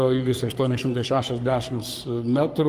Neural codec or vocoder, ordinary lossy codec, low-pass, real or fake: codec, 44.1 kHz, 3.4 kbps, Pupu-Codec; Opus, 32 kbps; 14.4 kHz; fake